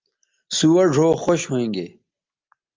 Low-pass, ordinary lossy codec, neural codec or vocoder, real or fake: 7.2 kHz; Opus, 24 kbps; none; real